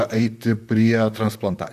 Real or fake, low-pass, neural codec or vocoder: fake; 14.4 kHz; vocoder, 44.1 kHz, 128 mel bands, Pupu-Vocoder